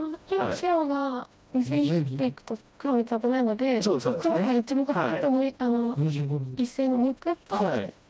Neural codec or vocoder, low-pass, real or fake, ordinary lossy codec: codec, 16 kHz, 1 kbps, FreqCodec, smaller model; none; fake; none